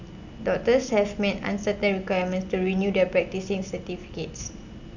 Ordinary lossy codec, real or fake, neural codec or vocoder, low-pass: none; real; none; 7.2 kHz